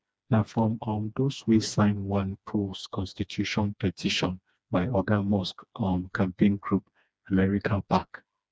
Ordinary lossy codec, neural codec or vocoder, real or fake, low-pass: none; codec, 16 kHz, 2 kbps, FreqCodec, smaller model; fake; none